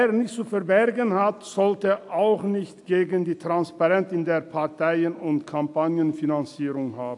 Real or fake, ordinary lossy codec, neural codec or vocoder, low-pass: real; AAC, 64 kbps; none; 10.8 kHz